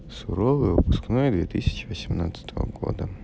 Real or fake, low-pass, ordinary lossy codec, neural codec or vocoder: real; none; none; none